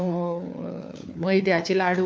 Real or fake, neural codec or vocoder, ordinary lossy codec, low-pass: fake; codec, 16 kHz, 4 kbps, FunCodec, trained on LibriTTS, 50 frames a second; none; none